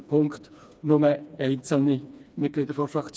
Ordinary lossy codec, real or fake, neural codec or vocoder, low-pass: none; fake; codec, 16 kHz, 2 kbps, FreqCodec, smaller model; none